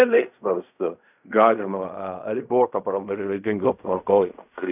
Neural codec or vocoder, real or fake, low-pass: codec, 16 kHz in and 24 kHz out, 0.4 kbps, LongCat-Audio-Codec, fine tuned four codebook decoder; fake; 3.6 kHz